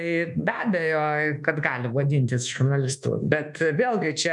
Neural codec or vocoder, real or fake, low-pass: codec, 24 kHz, 1.2 kbps, DualCodec; fake; 10.8 kHz